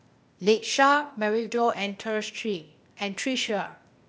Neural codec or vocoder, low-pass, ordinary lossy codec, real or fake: codec, 16 kHz, 0.8 kbps, ZipCodec; none; none; fake